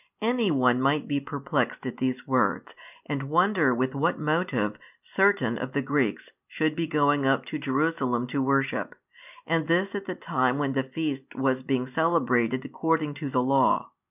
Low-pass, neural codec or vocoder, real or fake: 3.6 kHz; none; real